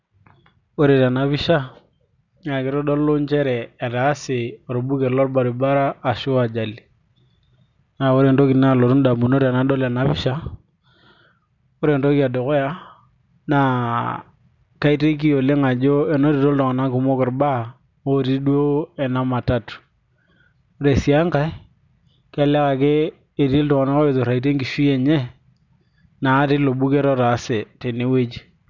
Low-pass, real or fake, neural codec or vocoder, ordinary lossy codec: 7.2 kHz; real; none; none